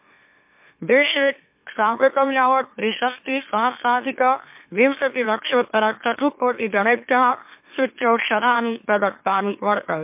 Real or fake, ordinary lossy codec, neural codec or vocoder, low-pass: fake; MP3, 32 kbps; autoencoder, 44.1 kHz, a latent of 192 numbers a frame, MeloTTS; 3.6 kHz